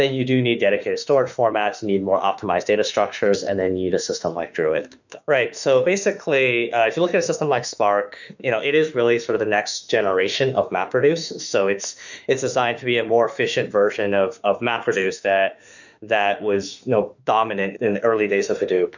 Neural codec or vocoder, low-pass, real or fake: autoencoder, 48 kHz, 32 numbers a frame, DAC-VAE, trained on Japanese speech; 7.2 kHz; fake